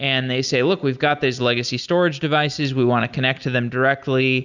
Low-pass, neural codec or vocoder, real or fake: 7.2 kHz; none; real